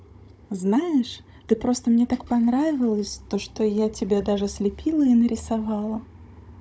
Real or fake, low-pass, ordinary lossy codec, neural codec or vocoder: fake; none; none; codec, 16 kHz, 16 kbps, FunCodec, trained on Chinese and English, 50 frames a second